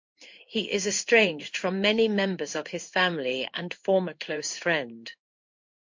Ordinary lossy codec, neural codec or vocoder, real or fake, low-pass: MP3, 48 kbps; codec, 16 kHz in and 24 kHz out, 1 kbps, XY-Tokenizer; fake; 7.2 kHz